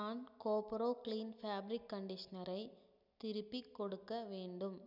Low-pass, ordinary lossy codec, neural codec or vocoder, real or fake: 5.4 kHz; none; none; real